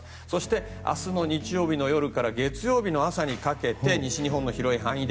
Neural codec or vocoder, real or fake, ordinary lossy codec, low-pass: none; real; none; none